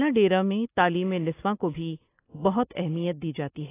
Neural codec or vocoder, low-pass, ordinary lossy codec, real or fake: none; 3.6 kHz; AAC, 16 kbps; real